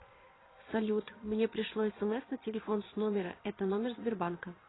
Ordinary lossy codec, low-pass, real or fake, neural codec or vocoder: AAC, 16 kbps; 7.2 kHz; fake; vocoder, 22.05 kHz, 80 mel bands, Vocos